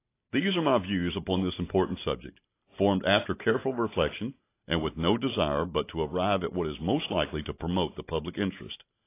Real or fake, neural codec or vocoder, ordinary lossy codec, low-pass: real; none; AAC, 24 kbps; 3.6 kHz